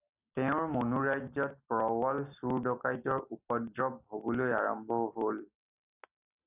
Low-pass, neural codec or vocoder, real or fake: 3.6 kHz; none; real